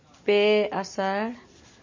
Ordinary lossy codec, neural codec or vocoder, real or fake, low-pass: MP3, 32 kbps; none; real; 7.2 kHz